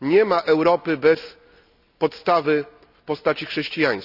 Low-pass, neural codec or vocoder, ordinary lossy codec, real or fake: 5.4 kHz; none; none; real